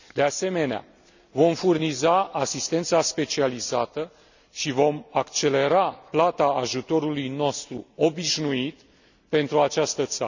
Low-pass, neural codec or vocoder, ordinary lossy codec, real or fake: 7.2 kHz; none; none; real